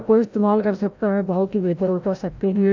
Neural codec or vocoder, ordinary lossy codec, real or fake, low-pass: codec, 16 kHz, 0.5 kbps, FreqCodec, larger model; none; fake; 7.2 kHz